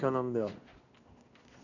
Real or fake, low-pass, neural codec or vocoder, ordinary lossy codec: fake; 7.2 kHz; codec, 16 kHz in and 24 kHz out, 1 kbps, XY-Tokenizer; none